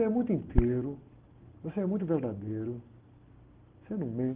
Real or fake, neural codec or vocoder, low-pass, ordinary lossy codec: real; none; 3.6 kHz; Opus, 16 kbps